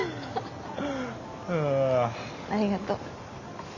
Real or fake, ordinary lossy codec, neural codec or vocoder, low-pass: real; none; none; 7.2 kHz